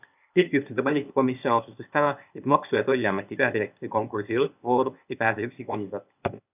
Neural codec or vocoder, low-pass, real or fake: codec, 16 kHz, 0.8 kbps, ZipCodec; 3.6 kHz; fake